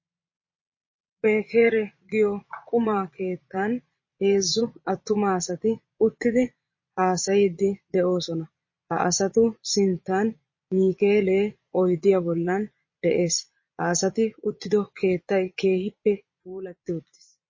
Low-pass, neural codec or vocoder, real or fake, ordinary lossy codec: 7.2 kHz; vocoder, 22.05 kHz, 80 mel bands, Vocos; fake; MP3, 32 kbps